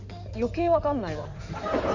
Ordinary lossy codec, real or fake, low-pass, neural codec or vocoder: none; fake; 7.2 kHz; codec, 16 kHz in and 24 kHz out, 2.2 kbps, FireRedTTS-2 codec